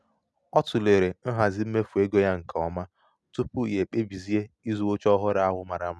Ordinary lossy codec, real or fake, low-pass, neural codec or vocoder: none; real; none; none